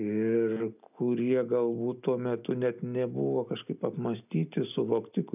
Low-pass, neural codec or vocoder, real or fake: 3.6 kHz; none; real